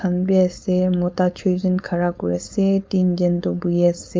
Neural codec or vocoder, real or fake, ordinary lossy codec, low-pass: codec, 16 kHz, 4.8 kbps, FACodec; fake; none; none